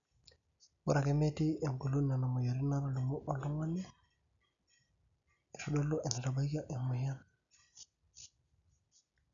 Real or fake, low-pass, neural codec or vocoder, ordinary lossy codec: real; 7.2 kHz; none; none